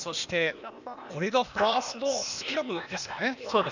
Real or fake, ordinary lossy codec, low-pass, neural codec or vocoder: fake; none; 7.2 kHz; codec, 16 kHz, 0.8 kbps, ZipCodec